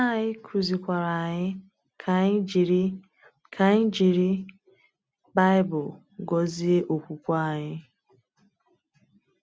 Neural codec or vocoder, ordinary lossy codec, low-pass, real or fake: none; none; none; real